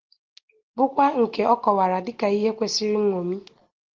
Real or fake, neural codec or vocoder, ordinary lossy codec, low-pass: real; none; Opus, 16 kbps; 7.2 kHz